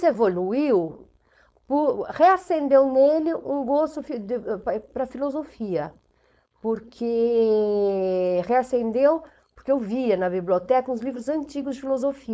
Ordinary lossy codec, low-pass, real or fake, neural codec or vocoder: none; none; fake; codec, 16 kHz, 4.8 kbps, FACodec